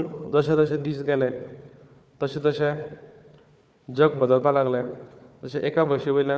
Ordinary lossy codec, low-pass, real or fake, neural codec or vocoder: none; none; fake; codec, 16 kHz, 4 kbps, FunCodec, trained on Chinese and English, 50 frames a second